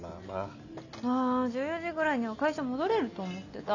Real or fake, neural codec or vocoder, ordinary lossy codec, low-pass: real; none; none; 7.2 kHz